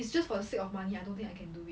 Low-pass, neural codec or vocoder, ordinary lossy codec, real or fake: none; none; none; real